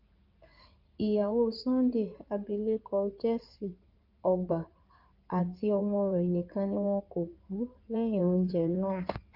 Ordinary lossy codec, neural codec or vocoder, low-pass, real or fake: Opus, 24 kbps; codec, 16 kHz in and 24 kHz out, 2.2 kbps, FireRedTTS-2 codec; 5.4 kHz; fake